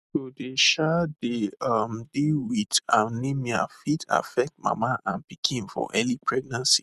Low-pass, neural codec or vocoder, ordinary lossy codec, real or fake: 14.4 kHz; none; none; real